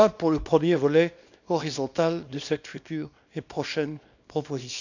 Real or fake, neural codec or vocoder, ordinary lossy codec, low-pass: fake; codec, 24 kHz, 0.9 kbps, WavTokenizer, small release; none; 7.2 kHz